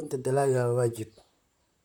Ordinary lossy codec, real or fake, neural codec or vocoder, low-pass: none; fake; vocoder, 44.1 kHz, 128 mel bands, Pupu-Vocoder; 19.8 kHz